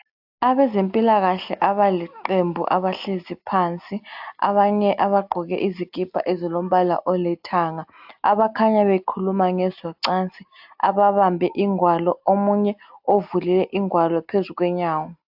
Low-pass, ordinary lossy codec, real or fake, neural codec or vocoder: 5.4 kHz; AAC, 48 kbps; real; none